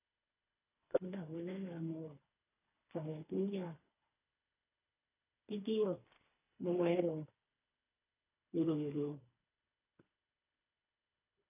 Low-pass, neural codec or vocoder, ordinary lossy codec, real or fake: 3.6 kHz; codec, 24 kHz, 3 kbps, HILCodec; none; fake